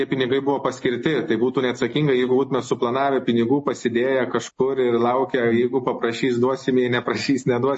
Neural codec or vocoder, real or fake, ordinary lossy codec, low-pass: none; real; MP3, 32 kbps; 10.8 kHz